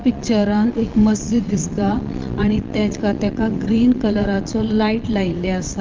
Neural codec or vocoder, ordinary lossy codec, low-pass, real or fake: vocoder, 22.05 kHz, 80 mel bands, Vocos; Opus, 32 kbps; 7.2 kHz; fake